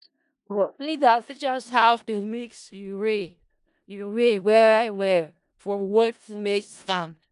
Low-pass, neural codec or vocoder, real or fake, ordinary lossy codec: 10.8 kHz; codec, 16 kHz in and 24 kHz out, 0.4 kbps, LongCat-Audio-Codec, four codebook decoder; fake; none